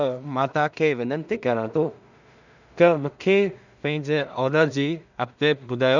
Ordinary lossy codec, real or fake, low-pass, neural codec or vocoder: none; fake; 7.2 kHz; codec, 16 kHz in and 24 kHz out, 0.4 kbps, LongCat-Audio-Codec, two codebook decoder